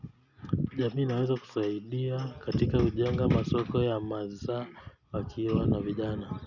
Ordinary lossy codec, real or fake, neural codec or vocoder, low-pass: none; real; none; 7.2 kHz